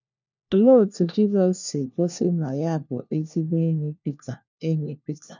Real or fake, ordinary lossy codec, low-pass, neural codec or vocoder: fake; none; 7.2 kHz; codec, 16 kHz, 1 kbps, FunCodec, trained on LibriTTS, 50 frames a second